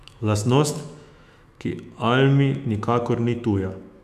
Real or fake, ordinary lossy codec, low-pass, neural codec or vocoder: fake; none; 14.4 kHz; autoencoder, 48 kHz, 128 numbers a frame, DAC-VAE, trained on Japanese speech